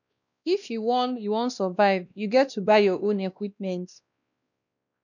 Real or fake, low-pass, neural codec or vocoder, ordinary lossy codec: fake; 7.2 kHz; codec, 16 kHz, 1 kbps, X-Codec, WavLM features, trained on Multilingual LibriSpeech; none